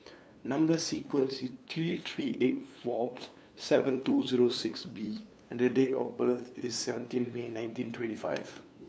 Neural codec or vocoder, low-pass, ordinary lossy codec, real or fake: codec, 16 kHz, 2 kbps, FunCodec, trained on LibriTTS, 25 frames a second; none; none; fake